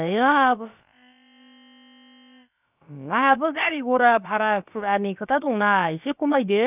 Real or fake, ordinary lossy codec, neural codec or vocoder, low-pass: fake; none; codec, 16 kHz, about 1 kbps, DyCAST, with the encoder's durations; 3.6 kHz